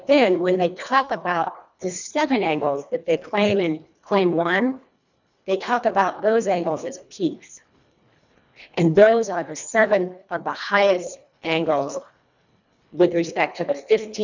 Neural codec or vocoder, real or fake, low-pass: codec, 24 kHz, 1.5 kbps, HILCodec; fake; 7.2 kHz